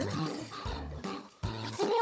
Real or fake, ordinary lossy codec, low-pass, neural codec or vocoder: fake; none; none; codec, 16 kHz, 16 kbps, FunCodec, trained on Chinese and English, 50 frames a second